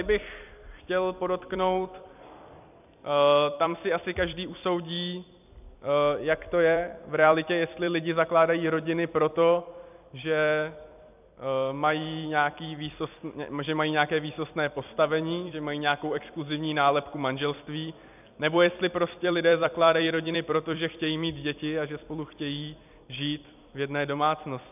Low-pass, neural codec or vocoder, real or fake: 3.6 kHz; vocoder, 44.1 kHz, 128 mel bands every 256 samples, BigVGAN v2; fake